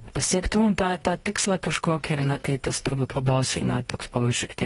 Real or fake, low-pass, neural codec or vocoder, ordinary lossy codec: fake; 10.8 kHz; codec, 24 kHz, 0.9 kbps, WavTokenizer, medium music audio release; AAC, 32 kbps